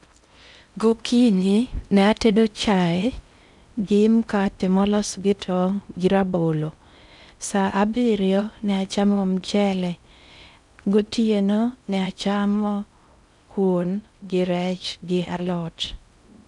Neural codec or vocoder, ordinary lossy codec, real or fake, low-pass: codec, 16 kHz in and 24 kHz out, 0.6 kbps, FocalCodec, streaming, 4096 codes; none; fake; 10.8 kHz